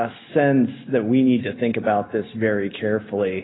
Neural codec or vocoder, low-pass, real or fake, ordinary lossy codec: none; 7.2 kHz; real; AAC, 16 kbps